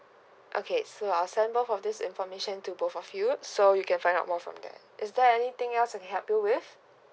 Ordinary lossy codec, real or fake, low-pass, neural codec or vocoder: none; real; none; none